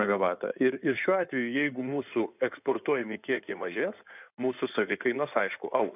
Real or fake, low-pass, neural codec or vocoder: fake; 3.6 kHz; codec, 16 kHz in and 24 kHz out, 2.2 kbps, FireRedTTS-2 codec